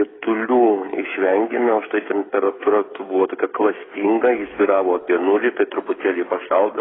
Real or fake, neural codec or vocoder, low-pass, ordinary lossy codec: fake; codec, 16 kHz, 8 kbps, FreqCodec, smaller model; 7.2 kHz; AAC, 16 kbps